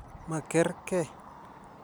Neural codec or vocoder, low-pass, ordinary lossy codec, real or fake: none; none; none; real